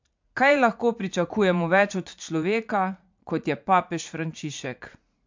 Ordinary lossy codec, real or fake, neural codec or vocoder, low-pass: MP3, 64 kbps; fake; vocoder, 44.1 kHz, 80 mel bands, Vocos; 7.2 kHz